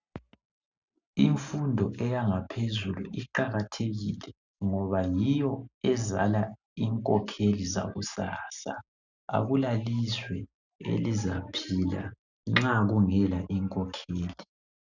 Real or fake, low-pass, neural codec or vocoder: real; 7.2 kHz; none